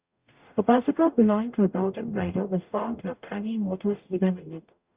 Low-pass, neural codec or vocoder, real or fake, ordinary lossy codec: 3.6 kHz; codec, 44.1 kHz, 0.9 kbps, DAC; fake; Opus, 64 kbps